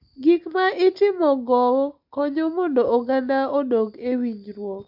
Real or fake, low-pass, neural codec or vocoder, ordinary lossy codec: real; 5.4 kHz; none; MP3, 48 kbps